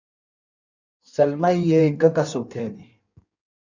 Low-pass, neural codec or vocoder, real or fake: 7.2 kHz; codec, 16 kHz in and 24 kHz out, 1.1 kbps, FireRedTTS-2 codec; fake